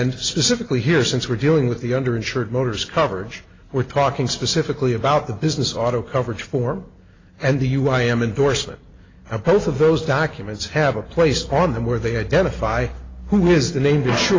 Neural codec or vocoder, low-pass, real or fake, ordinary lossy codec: none; 7.2 kHz; real; AAC, 32 kbps